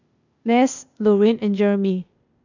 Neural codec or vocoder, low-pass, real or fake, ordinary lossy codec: codec, 16 kHz, 0.8 kbps, ZipCodec; 7.2 kHz; fake; none